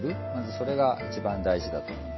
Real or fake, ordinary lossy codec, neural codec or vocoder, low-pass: real; MP3, 24 kbps; none; 7.2 kHz